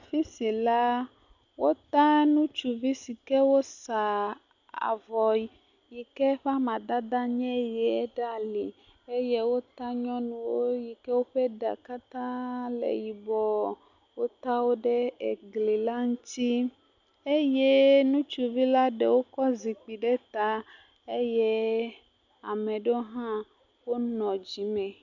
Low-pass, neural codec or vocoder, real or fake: 7.2 kHz; none; real